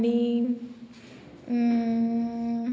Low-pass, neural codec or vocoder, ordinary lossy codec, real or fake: none; none; none; real